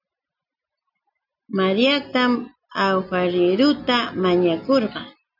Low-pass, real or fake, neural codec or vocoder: 5.4 kHz; real; none